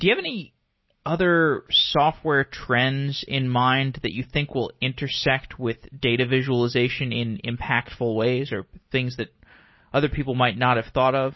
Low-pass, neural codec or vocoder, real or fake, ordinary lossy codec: 7.2 kHz; none; real; MP3, 24 kbps